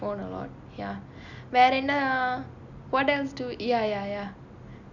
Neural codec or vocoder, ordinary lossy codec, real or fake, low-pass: none; none; real; 7.2 kHz